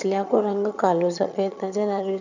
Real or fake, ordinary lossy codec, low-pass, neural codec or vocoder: fake; none; 7.2 kHz; vocoder, 22.05 kHz, 80 mel bands, HiFi-GAN